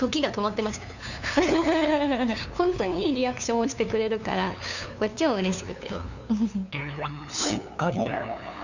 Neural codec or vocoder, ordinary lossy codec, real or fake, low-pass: codec, 16 kHz, 2 kbps, FunCodec, trained on LibriTTS, 25 frames a second; none; fake; 7.2 kHz